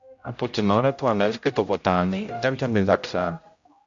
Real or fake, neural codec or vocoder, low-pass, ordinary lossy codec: fake; codec, 16 kHz, 0.5 kbps, X-Codec, HuBERT features, trained on general audio; 7.2 kHz; MP3, 48 kbps